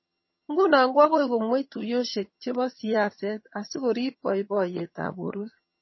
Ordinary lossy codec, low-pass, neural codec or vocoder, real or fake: MP3, 24 kbps; 7.2 kHz; vocoder, 22.05 kHz, 80 mel bands, HiFi-GAN; fake